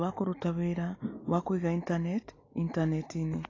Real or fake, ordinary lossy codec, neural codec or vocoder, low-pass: real; AAC, 32 kbps; none; 7.2 kHz